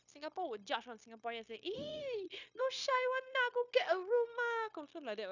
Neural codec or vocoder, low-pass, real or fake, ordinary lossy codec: codec, 16 kHz, 0.9 kbps, LongCat-Audio-Codec; 7.2 kHz; fake; none